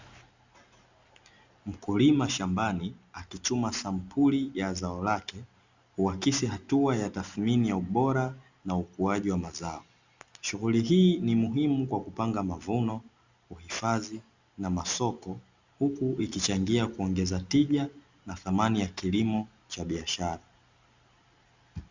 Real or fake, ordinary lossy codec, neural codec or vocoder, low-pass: real; Opus, 64 kbps; none; 7.2 kHz